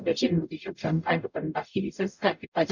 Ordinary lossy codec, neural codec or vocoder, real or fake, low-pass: none; codec, 44.1 kHz, 0.9 kbps, DAC; fake; 7.2 kHz